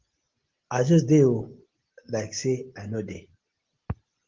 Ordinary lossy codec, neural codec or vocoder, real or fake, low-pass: Opus, 16 kbps; none; real; 7.2 kHz